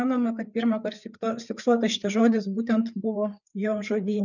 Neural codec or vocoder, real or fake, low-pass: codec, 16 kHz, 4 kbps, FreqCodec, larger model; fake; 7.2 kHz